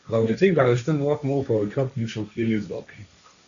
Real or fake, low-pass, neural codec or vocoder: fake; 7.2 kHz; codec, 16 kHz, 1.1 kbps, Voila-Tokenizer